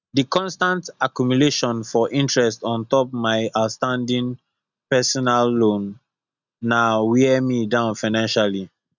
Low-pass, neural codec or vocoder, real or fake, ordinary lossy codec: 7.2 kHz; none; real; none